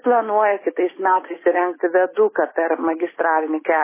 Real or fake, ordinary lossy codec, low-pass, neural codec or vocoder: real; MP3, 16 kbps; 3.6 kHz; none